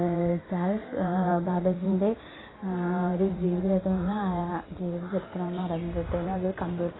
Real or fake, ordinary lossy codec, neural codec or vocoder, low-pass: fake; AAC, 16 kbps; vocoder, 44.1 kHz, 128 mel bands every 512 samples, BigVGAN v2; 7.2 kHz